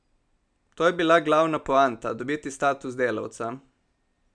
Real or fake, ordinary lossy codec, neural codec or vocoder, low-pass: real; none; none; 9.9 kHz